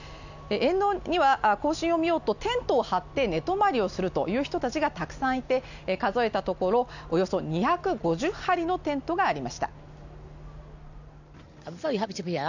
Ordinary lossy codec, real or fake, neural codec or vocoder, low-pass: none; real; none; 7.2 kHz